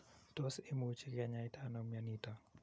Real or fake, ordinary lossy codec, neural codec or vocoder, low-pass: real; none; none; none